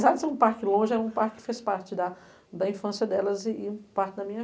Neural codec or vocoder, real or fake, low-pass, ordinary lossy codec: none; real; none; none